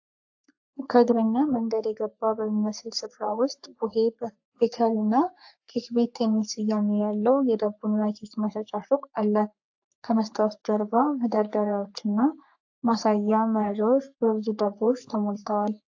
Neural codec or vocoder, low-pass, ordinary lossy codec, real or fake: codec, 44.1 kHz, 3.4 kbps, Pupu-Codec; 7.2 kHz; AAC, 48 kbps; fake